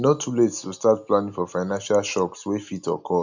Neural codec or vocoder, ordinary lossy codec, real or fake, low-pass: none; none; real; 7.2 kHz